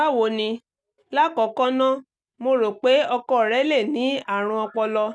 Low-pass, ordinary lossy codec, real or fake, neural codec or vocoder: none; none; real; none